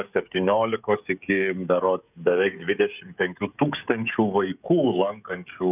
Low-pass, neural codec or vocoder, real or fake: 3.6 kHz; codec, 16 kHz, 16 kbps, FreqCodec, smaller model; fake